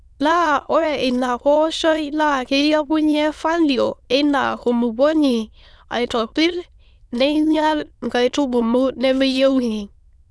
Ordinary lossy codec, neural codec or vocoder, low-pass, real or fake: none; autoencoder, 22.05 kHz, a latent of 192 numbers a frame, VITS, trained on many speakers; none; fake